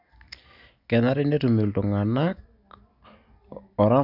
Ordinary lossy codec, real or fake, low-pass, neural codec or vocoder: none; real; 5.4 kHz; none